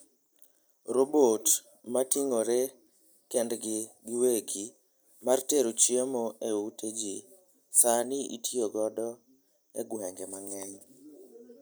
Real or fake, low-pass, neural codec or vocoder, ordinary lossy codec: real; none; none; none